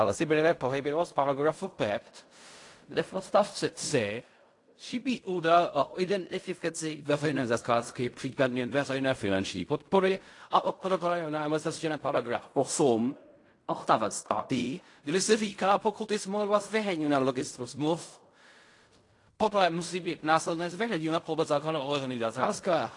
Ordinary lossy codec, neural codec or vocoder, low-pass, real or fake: AAC, 48 kbps; codec, 16 kHz in and 24 kHz out, 0.4 kbps, LongCat-Audio-Codec, fine tuned four codebook decoder; 10.8 kHz; fake